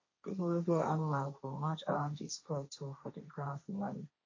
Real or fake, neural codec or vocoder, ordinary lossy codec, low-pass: fake; codec, 16 kHz, 1.1 kbps, Voila-Tokenizer; MP3, 32 kbps; 7.2 kHz